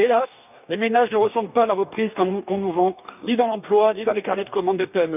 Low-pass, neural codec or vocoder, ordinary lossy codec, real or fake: 3.6 kHz; codec, 44.1 kHz, 2.6 kbps, DAC; none; fake